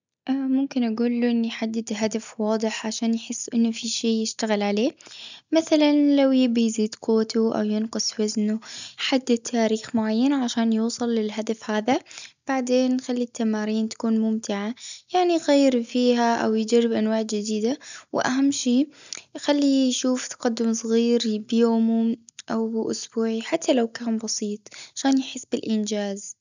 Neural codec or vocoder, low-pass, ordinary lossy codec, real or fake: none; 7.2 kHz; none; real